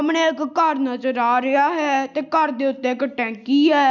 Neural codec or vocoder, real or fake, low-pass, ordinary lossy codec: none; real; 7.2 kHz; none